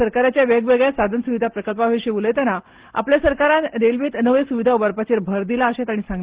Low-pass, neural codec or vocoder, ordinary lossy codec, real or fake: 3.6 kHz; none; Opus, 16 kbps; real